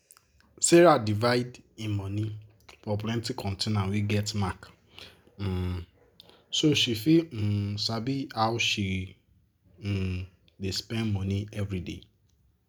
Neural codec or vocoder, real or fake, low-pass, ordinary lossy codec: none; real; none; none